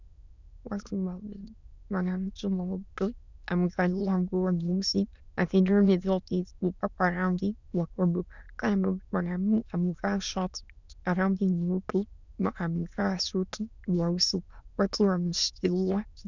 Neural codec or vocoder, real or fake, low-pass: autoencoder, 22.05 kHz, a latent of 192 numbers a frame, VITS, trained on many speakers; fake; 7.2 kHz